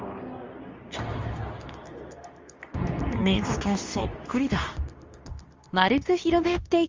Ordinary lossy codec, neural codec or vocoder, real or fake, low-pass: Opus, 32 kbps; codec, 24 kHz, 0.9 kbps, WavTokenizer, medium speech release version 2; fake; 7.2 kHz